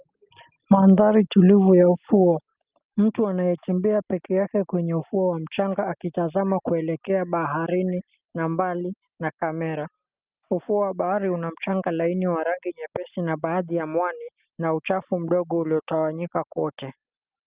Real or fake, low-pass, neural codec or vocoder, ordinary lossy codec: real; 3.6 kHz; none; Opus, 32 kbps